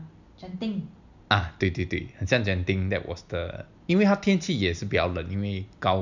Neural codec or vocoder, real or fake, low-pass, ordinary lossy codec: none; real; 7.2 kHz; none